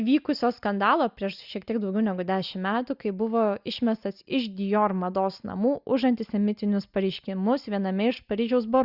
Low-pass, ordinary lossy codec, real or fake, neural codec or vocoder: 5.4 kHz; Opus, 64 kbps; real; none